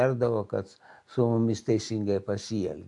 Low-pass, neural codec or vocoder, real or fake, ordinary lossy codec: 10.8 kHz; none; real; AAC, 64 kbps